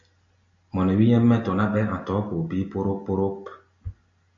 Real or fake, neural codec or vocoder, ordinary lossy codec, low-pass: real; none; AAC, 64 kbps; 7.2 kHz